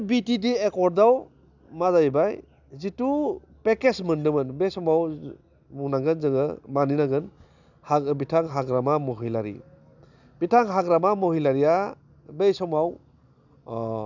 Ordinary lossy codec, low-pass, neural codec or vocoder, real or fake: none; 7.2 kHz; none; real